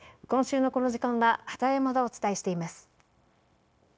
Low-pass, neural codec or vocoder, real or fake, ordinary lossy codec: none; codec, 16 kHz, 0.9 kbps, LongCat-Audio-Codec; fake; none